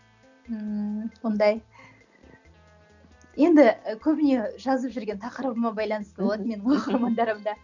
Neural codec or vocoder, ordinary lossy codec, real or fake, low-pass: none; none; real; 7.2 kHz